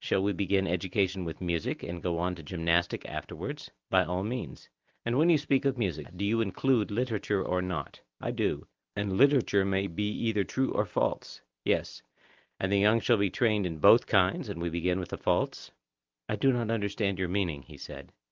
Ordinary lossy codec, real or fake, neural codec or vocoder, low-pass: Opus, 24 kbps; real; none; 7.2 kHz